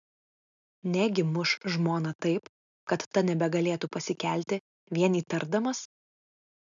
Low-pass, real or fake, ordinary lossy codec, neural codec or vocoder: 7.2 kHz; real; MP3, 96 kbps; none